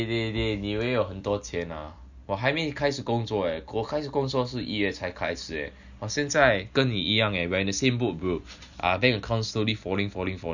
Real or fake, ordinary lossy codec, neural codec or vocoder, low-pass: real; none; none; 7.2 kHz